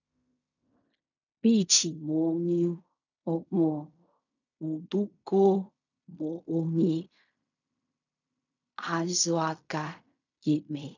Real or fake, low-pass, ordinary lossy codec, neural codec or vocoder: fake; 7.2 kHz; none; codec, 16 kHz in and 24 kHz out, 0.4 kbps, LongCat-Audio-Codec, fine tuned four codebook decoder